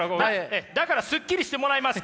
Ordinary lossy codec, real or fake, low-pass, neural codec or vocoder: none; real; none; none